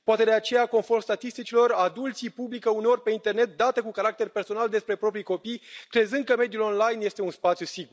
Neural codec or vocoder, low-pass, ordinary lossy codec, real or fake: none; none; none; real